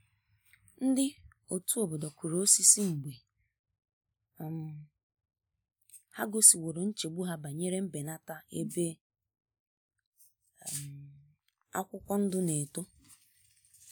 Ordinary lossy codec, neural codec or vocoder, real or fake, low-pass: none; none; real; none